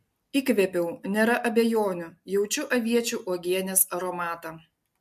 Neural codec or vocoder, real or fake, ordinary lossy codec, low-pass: none; real; MP3, 64 kbps; 14.4 kHz